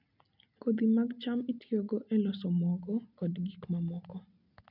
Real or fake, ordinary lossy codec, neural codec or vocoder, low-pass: real; none; none; 5.4 kHz